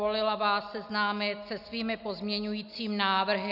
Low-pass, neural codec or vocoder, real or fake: 5.4 kHz; none; real